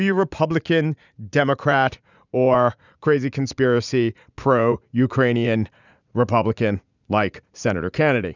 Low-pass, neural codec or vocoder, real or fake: 7.2 kHz; vocoder, 44.1 kHz, 80 mel bands, Vocos; fake